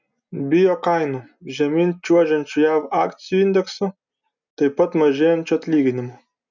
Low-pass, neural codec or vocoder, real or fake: 7.2 kHz; none; real